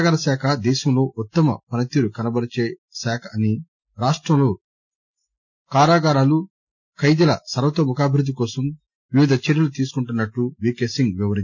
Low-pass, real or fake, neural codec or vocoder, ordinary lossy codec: 7.2 kHz; real; none; MP3, 64 kbps